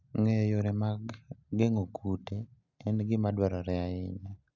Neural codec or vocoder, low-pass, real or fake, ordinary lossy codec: none; 7.2 kHz; real; none